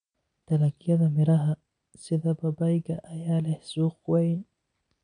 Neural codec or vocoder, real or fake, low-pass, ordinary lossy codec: none; real; 9.9 kHz; none